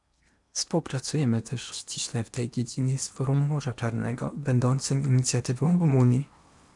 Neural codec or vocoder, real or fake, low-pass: codec, 16 kHz in and 24 kHz out, 0.8 kbps, FocalCodec, streaming, 65536 codes; fake; 10.8 kHz